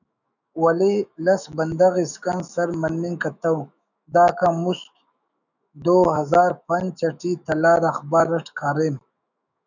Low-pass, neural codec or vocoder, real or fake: 7.2 kHz; autoencoder, 48 kHz, 128 numbers a frame, DAC-VAE, trained on Japanese speech; fake